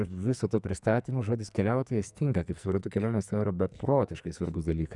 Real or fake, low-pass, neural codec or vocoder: fake; 10.8 kHz; codec, 44.1 kHz, 2.6 kbps, SNAC